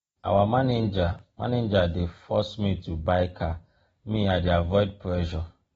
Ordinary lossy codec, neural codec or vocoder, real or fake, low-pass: AAC, 24 kbps; none; real; 19.8 kHz